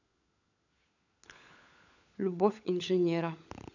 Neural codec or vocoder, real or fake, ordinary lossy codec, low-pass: codec, 16 kHz, 16 kbps, FunCodec, trained on LibriTTS, 50 frames a second; fake; none; 7.2 kHz